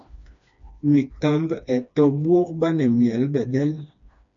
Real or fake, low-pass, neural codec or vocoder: fake; 7.2 kHz; codec, 16 kHz, 2 kbps, FreqCodec, smaller model